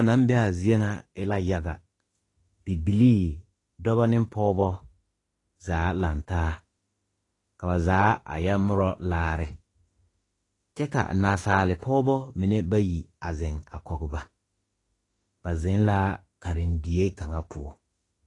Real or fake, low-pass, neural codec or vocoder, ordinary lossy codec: fake; 10.8 kHz; autoencoder, 48 kHz, 32 numbers a frame, DAC-VAE, trained on Japanese speech; AAC, 32 kbps